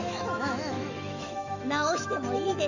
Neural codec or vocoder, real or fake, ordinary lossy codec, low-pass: autoencoder, 48 kHz, 128 numbers a frame, DAC-VAE, trained on Japanese speech; fake; none; 7.2 kHz